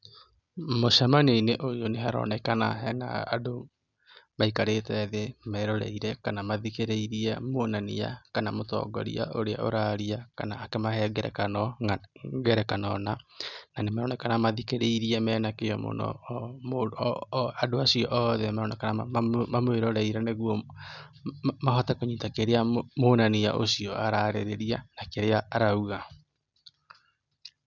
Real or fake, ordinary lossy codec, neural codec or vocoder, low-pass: real; none; none; 7.2 kHz